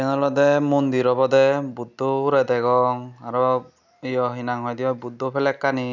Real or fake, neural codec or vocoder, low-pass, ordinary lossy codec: real; none; 7.2 kHz; none